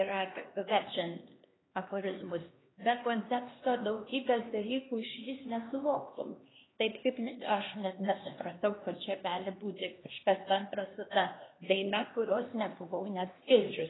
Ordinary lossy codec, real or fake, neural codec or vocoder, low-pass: AAC, 16 kbps; fake; codec, 16 kHz, 2 kbps, X-Codec, HuBERT features, trained on LibriSpeech; 7.2 kHz